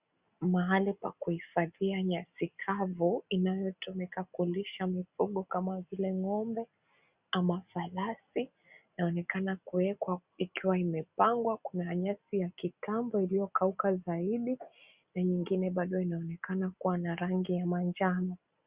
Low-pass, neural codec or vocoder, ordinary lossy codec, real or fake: 3.6 kHz; none; Opus, 64 kbps; real